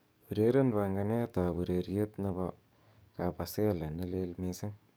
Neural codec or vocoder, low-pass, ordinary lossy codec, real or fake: codec, 44.1 kHz, 7.8 kbps, DAC; none; none; fake